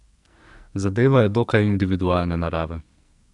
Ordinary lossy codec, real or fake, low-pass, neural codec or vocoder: none; fake; 10.8 kHz; codec, 44.1 kHz, 2.6 kbps, SNAC